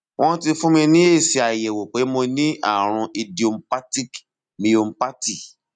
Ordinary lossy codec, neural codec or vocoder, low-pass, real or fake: none; none; 9.9 kHz; real